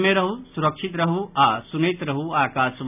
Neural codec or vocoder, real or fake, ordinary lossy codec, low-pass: none; real; none; 3.6 kHz